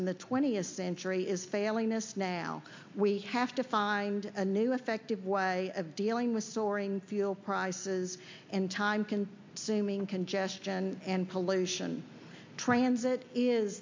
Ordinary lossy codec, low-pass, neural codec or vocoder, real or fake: MP3, 48 kbps; 7.2 kHz; none; real